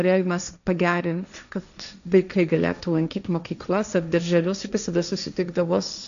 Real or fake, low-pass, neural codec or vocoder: fake; 7.2 kHz; codec, 16 kHz, 1.1 kbps, Voila-Tokenizer